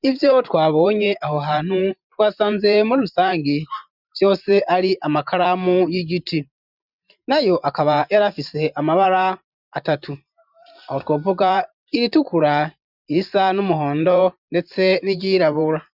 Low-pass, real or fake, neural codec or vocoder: 5.4 kHz; fake; vocoder, 44.1 kHz, 128 mel bands every 512 samples, BigVGAN v2